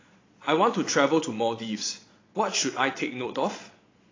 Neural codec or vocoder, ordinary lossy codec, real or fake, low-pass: vocoder, 22.05 kHz, 80 mel bands, Vocos; AAC, 32 kbps; fake; 7.2 kHz